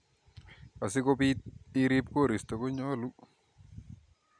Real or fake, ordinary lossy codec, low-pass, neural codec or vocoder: real; none; 9.9 kHz; none